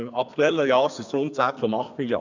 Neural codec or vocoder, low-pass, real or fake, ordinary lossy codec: codec, 32 kHz, 1.9 kbps, SNAC; 7.2 kHz; fake; none